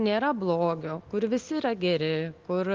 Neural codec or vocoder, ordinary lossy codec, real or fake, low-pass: none; Opus, 32 kbps; real; 7.2 kHz